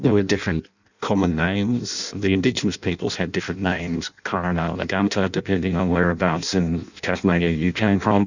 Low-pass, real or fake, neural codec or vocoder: 7.2 kHz; fake; codec, 16 kHz in and 24 kHz out, 0.6 kbps, FireRedTTS-2 codec